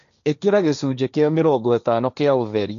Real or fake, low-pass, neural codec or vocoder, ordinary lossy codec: fake; 7.2 kHz; codec, 16 kHz, 1.1 kbps, Voila-Tokenizer; none